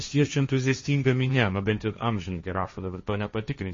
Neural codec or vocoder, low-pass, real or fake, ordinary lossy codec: codec, 16 kHz, 1.1 kbps, Voila-Tokenizer; 7.2 kHz; fake; MP3, 32 kbps